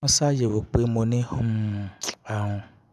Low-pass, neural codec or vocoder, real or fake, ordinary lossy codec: none; vocoder, 24 kHz, 100 mel bands, Vocos; fake; none